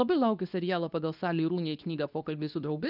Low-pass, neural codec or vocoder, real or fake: 5.4 kHz; codec, 24 kHz, 0.9 kbps, WavTokenizer, medium speech release version 2; fake